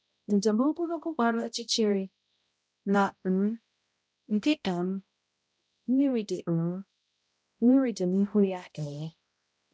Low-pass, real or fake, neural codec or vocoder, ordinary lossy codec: none; fake; codec, 16 kHz, 0.5 kbps, X-Codec, HuBERT features, trained on balanced general audio; none